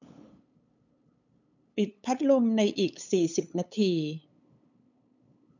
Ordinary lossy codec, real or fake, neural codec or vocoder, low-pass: none; fake; codec, 16 kHz, 8 kbps, FunCodec, trained on LibriTTS, 25 frames a second; 7.2 kHz